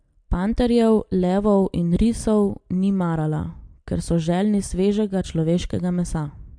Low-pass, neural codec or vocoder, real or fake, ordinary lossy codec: 9.9 kHz; none; real; MP3, 64 kbps